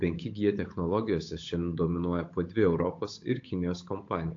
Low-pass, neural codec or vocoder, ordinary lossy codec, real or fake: 7.2 kHz; codec, 16 kHz, 8 kbps, FunCodec, trained on Chinese and English, 25 frames a second; AAC, 48 kbps; fake